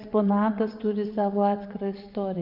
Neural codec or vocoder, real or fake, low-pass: vocoder, 22.05 kHz, 80 mel bands, WaveNeXt; fake; 5.4 kHz